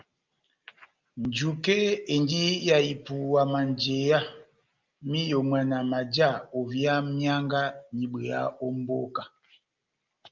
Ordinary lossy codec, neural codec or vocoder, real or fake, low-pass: Opus, 24 kbps; none; real; 7.2 kHz